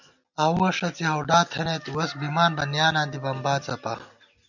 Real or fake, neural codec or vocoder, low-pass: real; none; 7.2 kHz